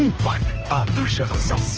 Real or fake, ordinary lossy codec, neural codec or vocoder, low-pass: fake; Opus, 16 kbps; codec, 16 kHz, 1 kbps, X-Codec, HuBERT features, trained on balanced general audio; 7.2 kHz